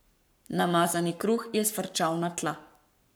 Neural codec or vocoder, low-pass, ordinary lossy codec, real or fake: codec, 44.1 kHz, 7.8 kbps, Pupu-Codec; none; none; fake